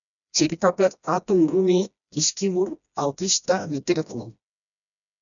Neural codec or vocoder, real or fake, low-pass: codec, 16 kHz, 1 kbps, FreqCodec, smaller model; fake; 7.2 kHz